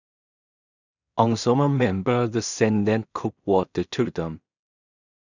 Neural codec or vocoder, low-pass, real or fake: codec, 16 kHz in and 24 kHz out, 0.4 kbps, LongCat-Audio-Codec, two codebook decoder; 7.2 kHz; fake